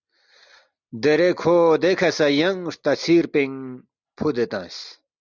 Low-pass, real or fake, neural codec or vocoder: 7.2 kHz; real; none